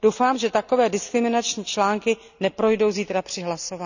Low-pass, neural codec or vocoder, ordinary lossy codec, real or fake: 7.2 kHz; none; none; real